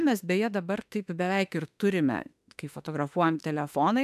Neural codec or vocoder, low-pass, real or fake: autoencoder, 48 kHz, 32 numbers a frame, DAC-VAE, trained on Japanese speech; 14.4 kHz; fake